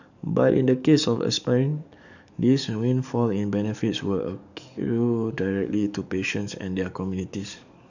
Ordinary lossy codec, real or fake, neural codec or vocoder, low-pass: none; fake; codec, 44.1 kHz, 7.8 kbps, DAC; 7.2 kHz